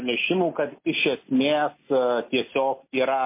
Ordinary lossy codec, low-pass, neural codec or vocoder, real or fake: MP3, 24 kbps; 3.6 kHz; none; real